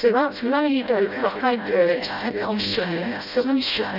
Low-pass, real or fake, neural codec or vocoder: 5.4 kHz; fake; codec, 16 kHz, 0.5 kbps, FreqCodec, smaller model